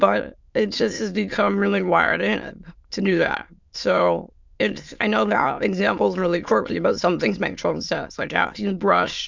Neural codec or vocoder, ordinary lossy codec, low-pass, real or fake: autoencoder, 22.05 kHz, a latent of 192 numbers a frame, VITS, trained on many speakers; MP3, 64 kbps; 7.2 kHz; fake